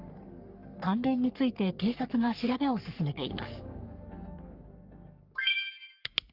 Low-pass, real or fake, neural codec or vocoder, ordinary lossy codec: 5.4 kHz; fake; codec, 44.1 kHz, 3.4 kbps, Pupu-Codec; Opus, 32 kbps